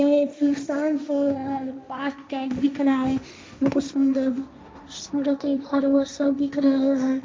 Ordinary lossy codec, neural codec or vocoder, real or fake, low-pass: none; codec, 16 kHz, 1.1 kbps, Voila-Tokenizer; fake; none